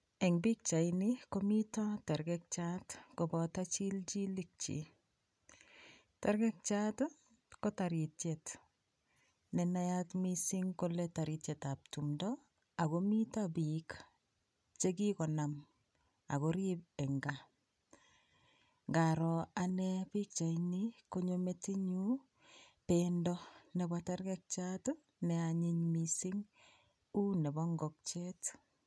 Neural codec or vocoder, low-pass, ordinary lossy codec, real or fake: none; none; none; real